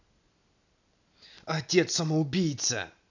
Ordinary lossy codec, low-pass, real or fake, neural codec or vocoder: none; 7.2 kHz; real; none